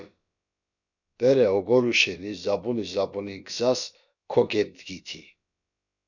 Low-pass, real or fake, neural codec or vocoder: 7.2 kHz; fake; codec, 16 kHz, about 1 kbps, DyCAST, with the encoder's durations